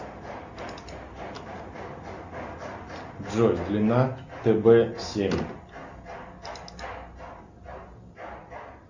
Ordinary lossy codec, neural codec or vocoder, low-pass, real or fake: Opus, 64 kbps; none; 7.2 kHz; real